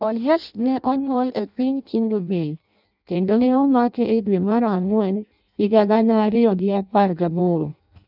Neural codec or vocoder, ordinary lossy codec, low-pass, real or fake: codec, 16 kHz in and 24 kHz out, 0.6 kbps, FireRedTTS-2 codec; none; 5.4 kHz; fake